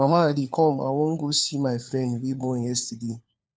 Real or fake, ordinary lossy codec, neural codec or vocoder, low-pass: fake; none; codec, 16 kHz, 4 kbps, FunCodec, trained on LibriTTS, 50 frames a second; none